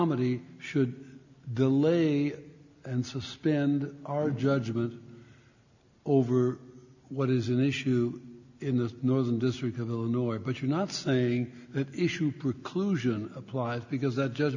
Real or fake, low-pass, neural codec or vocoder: real; 7.2 kHz; none